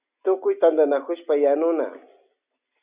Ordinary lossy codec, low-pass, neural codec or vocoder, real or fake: Opus, 64 kbps; 3.6 kHz; none; real